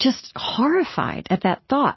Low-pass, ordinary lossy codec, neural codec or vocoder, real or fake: 7.2 kHz; MP3, 24 kbps; none; real